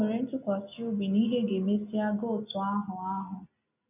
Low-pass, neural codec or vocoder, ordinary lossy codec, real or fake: 3.6 kHz; none; none; real